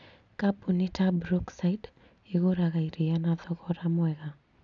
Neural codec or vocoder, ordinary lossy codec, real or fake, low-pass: none; none; real; 7.2 kHz